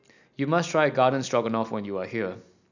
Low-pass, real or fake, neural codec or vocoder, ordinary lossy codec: 7.2 kHz; real; none; none